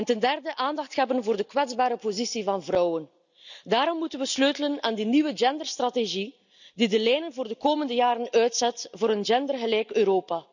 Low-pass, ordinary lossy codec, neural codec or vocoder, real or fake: 7.2 kHz; none; none; real